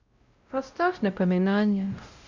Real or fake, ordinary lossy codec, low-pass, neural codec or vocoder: fake; Opus, 64 kbps; 7.2 kHz; codec, 16 kHz, 0.5 kbps, X-Codec, WavLM features, trained on Multilingual LibriSpeech